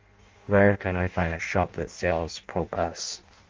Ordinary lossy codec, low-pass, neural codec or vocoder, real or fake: Opus, 32 kbps; 7.2 kHz; codec, 16 kHz in and 24 kHz out, 0.6 kbps, FireRedTTS-2 codec; fake